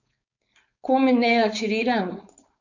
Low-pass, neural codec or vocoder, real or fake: 7.2 kHz; codec, 16 kHz, 4.8 kbps, FACodec; fake